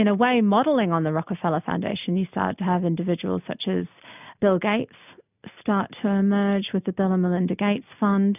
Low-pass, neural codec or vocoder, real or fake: 3.6 kHz; none; real